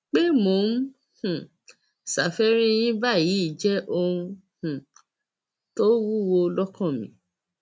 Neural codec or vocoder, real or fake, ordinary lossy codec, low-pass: none; real; none; none